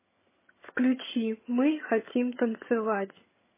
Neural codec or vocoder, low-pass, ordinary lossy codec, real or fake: vocoder, 22.05 kHz, 80 mel bands, HiFi-GAN; 3.6 kHz; MP3, 16 kbps; fake